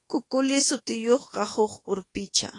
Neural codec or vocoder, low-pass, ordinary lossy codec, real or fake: codec, 24 kHz, 1.2 kbps, DualCodec; 10.8 kHz; AAC, 32 kbps; fake